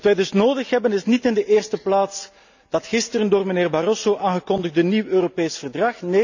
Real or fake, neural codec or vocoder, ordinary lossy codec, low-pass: real; none; none; 7.2 kHz